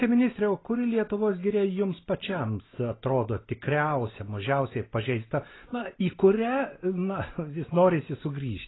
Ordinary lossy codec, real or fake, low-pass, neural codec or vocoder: AAC, 16 kbps; real; 7.2 kHz; none